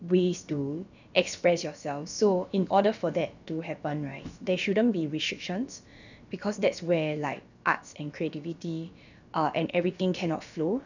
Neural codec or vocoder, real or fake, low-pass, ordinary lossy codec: codec, 16 kHz, about 1 kbps, DyCAST, with the encoder's durations; fake; 7.2 kHz; none